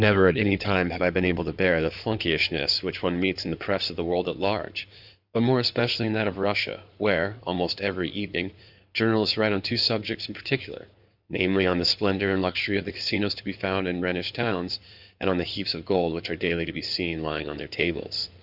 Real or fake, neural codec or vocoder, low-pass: fake; codec, 16 kHz in and 24 kHz out, 2.2 kbps, FireRedTTS-2 codec; 5.4 kHz